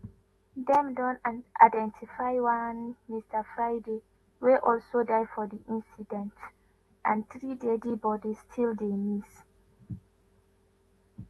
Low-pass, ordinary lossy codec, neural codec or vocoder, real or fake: 19.8 kHz; AAC, 32 kbps; autoencoder, 48 kHz, 128 numbers a frame, DAC-VAE, trained on Japanese speech; fake